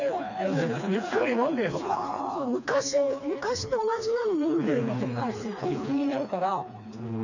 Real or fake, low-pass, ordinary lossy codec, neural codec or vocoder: fake; 7.2 kHz; none; codec, 16 kHz, 2 kbps, FreqCodec, smaller model